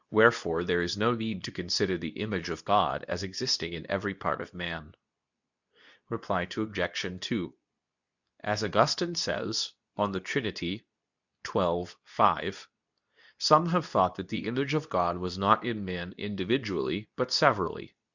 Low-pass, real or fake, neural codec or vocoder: 7.2 kHz; fake; codec, 24 kHz, 0.9 kbps, WavTokenizer, medium speech release version 2